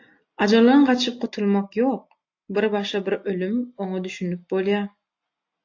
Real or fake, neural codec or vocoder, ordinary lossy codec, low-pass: real; none; AAC, 48 kbps; 7.2 kHz